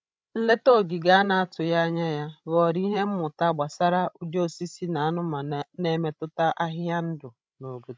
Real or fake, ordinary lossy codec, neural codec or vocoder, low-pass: fake; none; codec, 16 kHz, 16 kbps, FreqCodec, larger model; none